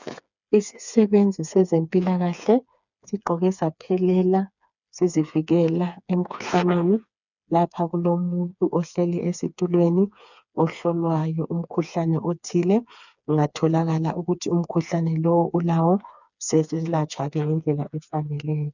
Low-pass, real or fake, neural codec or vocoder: 7.2 kHz; fake; codec, 16 kHz, 4 kbps, FreqCodec, smaller model